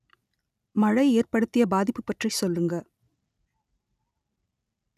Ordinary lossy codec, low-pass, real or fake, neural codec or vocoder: none; 14.4 kHz; real; none